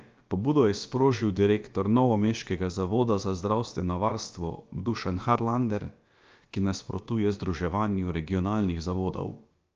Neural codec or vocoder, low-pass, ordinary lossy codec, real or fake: codec, 16 kHz, about 1 kbps, DyCAST, with the encoder's durations; 7.2 kHz; Opus, 24 kbps; fake